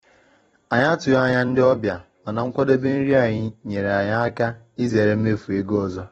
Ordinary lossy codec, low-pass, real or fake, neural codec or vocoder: AAC, 24 kbps; 19.8 kHz; fake; vocoder, 44.1 kHz, 128 mel bands every 256 samples, BigVGAN v2